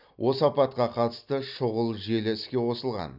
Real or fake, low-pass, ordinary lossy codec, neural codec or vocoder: real; 5.4 kHz; none; none